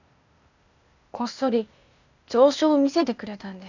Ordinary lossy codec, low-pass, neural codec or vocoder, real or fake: none; 7.2 kHz; codec, 16 kHz, 0.8 kbps, ZipCodec; fake